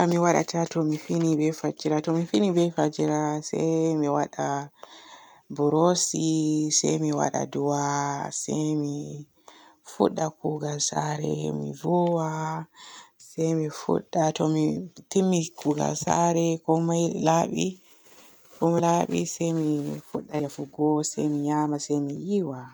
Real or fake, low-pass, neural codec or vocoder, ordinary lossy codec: real; none; none; none